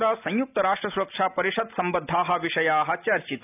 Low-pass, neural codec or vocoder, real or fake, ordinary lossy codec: 3.6 kHz; none; real; none